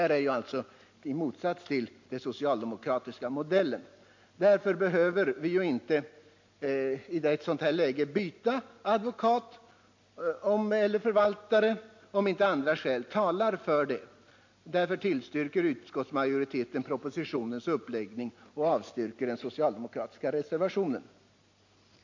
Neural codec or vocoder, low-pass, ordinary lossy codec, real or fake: none; 7.2 kHz; MP3, 48 kbps; real